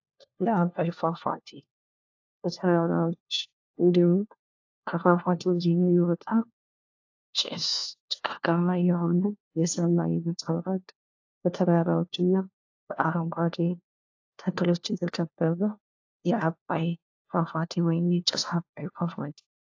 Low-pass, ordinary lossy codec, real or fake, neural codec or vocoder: 7.2 kHz; AAC, 48 kbps; fake; codec, 16 kHz, 1 kbps, FunCodec, trained on LibriTTS, 50 frames a second